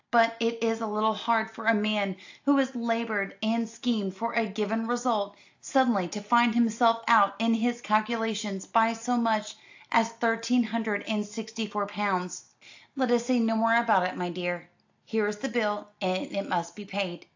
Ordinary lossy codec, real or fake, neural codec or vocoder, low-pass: AAC, 48 kbps; real; none; 7.2 kHz